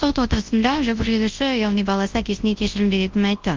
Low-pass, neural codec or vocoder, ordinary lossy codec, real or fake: 7.2 kHz; codec, 24 kHz, 0.9 kbps, WavTokenizer, large speech release; Opus, 32 kbps; fake